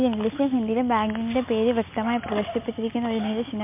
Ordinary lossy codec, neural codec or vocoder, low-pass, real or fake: MP3, 32 kbps; none; 3.6 kHz; real